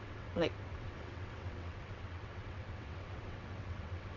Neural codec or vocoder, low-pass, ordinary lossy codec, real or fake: vocoder, 22.05 kHz, 80 mel bands, WaveNeXt; 7.2 kHz; none; fake